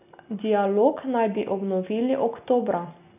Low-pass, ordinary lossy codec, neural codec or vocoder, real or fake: 3.6 kHz; none; none; real